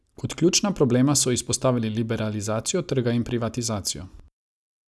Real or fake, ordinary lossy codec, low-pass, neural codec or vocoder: real; none; none; none